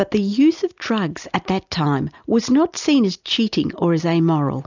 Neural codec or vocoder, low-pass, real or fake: none; 7.2 kHz; real